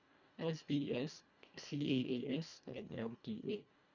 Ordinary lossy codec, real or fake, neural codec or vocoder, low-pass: none; fake; codec, 24 kHz, 1.5 kbps, HILCodec; 7.2 kHz